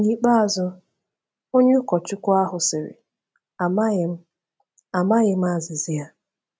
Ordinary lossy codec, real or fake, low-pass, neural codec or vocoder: none; real; none; none